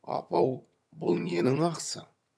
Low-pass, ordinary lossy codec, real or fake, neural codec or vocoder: none; none; fake; vocoder, 22.05 kHz, 80 mel bands, HiFi-GAN